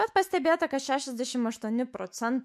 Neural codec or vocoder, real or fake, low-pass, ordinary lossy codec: autoencoder, 48 kHz, 128 numbers a frame, DAC-VAE, trained on Japanese speech; fake; 14.4 kHz; MP3, 64 kbps